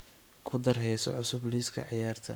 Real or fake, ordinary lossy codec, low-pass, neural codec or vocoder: fake; none; none; codec, 44.1 kHz, 7.8 kbps, Pupu-Codec